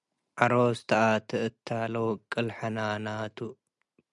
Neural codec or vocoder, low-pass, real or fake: none; 10.8 kHz; real